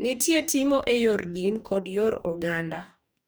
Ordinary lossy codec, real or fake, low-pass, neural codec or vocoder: none; fake; none; codec, 44.1 kHz, 2.6 kbps, DAC